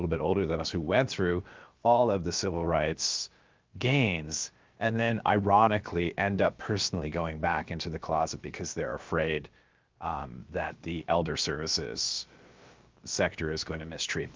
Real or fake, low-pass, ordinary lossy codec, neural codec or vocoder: fake; 7.2 kHz; Opus, 32 kbps; codec, 16 kHz, about 1 kbps, DyCAST, with the encoder's durations